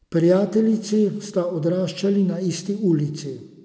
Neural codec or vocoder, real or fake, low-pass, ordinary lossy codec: none; real; none; none